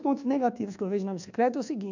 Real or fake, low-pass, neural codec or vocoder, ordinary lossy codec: fake; 7.2 kHz; codec, 24 kHz, 1.2 kbps, DualCodec; none